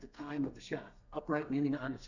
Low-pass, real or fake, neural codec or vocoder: 7.2 kHz; fake; codec, 32 kHz, 1.9 kbps, SNAC